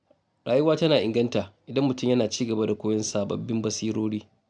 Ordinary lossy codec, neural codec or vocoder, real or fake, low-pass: none; none; real; 9.9 kHz